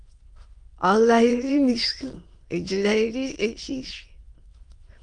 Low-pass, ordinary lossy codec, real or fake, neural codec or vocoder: 9.9 kHz; Opus, 32 kbps; fake; autoencoder, 22.05 kHz, a latent of 192 numbers a frame, VITS, trained on many speakers